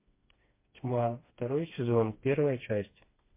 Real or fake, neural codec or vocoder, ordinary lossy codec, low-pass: fake; codec, 16 kHz, 2 kbps, FreqCodec, smaller model; MP3, 24 kbps; 3.6 kHz